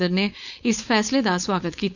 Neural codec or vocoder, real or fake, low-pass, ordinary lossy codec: codec, 16 kHz, 4.8 kbps, FACodec; fake; 7.2 kHz; none